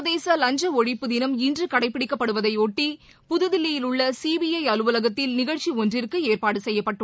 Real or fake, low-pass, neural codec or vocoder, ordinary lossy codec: real; none; none; none